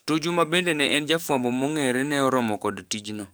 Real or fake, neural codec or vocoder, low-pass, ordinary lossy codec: fake; codec, 44.1 kHz, 7.8 kbps, DAC; none; none